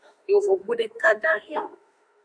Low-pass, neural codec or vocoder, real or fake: 9.9 kHz; autoencoder, 48 kHz, 32 numbers a frame, DAC-VAE, trained on Japanese speech; fake